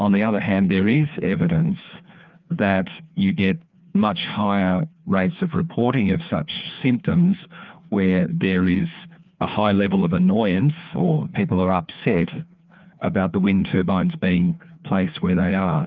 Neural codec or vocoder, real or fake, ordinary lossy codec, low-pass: codec, 16 kHz, 2 kbps, FreqCodec, larger model; fake; Opus, 24 kbps; 7.2 kHz